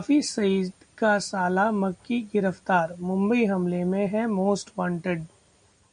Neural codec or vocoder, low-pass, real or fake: none; 9.9 kHz; real